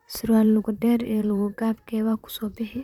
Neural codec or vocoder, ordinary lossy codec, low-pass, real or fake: vocoder, 44.1 kHz, 128 mel bands, Pupu-Vocoder; none; 19.8 kHz; fake